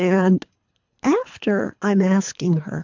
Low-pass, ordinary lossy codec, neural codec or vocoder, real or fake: 7.2 kHz; MP3, 64 kbps; codec, 24 kHz, 3 kbps, HILCodec; fake